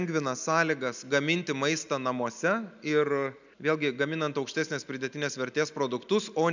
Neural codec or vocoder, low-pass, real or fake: none; 7.2 kHz; real